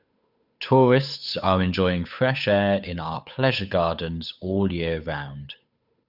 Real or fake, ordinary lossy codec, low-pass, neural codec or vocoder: fake; none; 5.4 kHz; codec, 16 kHz, 8 kbps, FunCodec, trained on Chinese and English, 25 frames a second